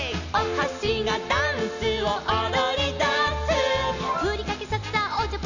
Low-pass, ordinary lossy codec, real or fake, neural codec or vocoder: 7.2 kHz; none; real; none